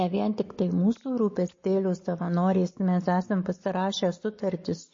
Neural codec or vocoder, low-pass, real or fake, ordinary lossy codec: none; 7.2 kHz; real; MP3, 32 kbps